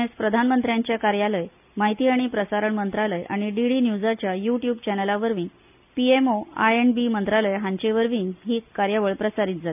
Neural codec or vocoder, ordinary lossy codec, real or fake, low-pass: none; none; real; 3.6 kHz